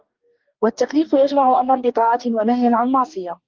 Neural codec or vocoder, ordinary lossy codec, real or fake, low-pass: codec, 44.1 kHz, 2.6 kbps, DAC; Opus, 32 kbps; fake; 7.2 kHz